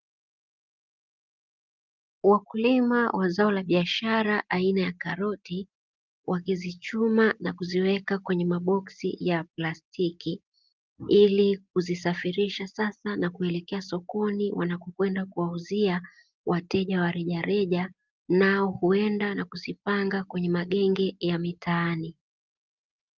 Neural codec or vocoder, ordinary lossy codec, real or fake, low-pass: none; Opus, 24 kbps; real; 7.2 kHz